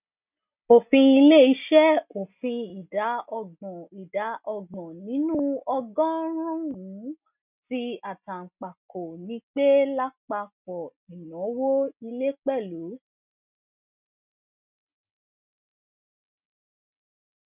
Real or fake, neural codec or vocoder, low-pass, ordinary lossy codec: real; none; 3.6 kHz; none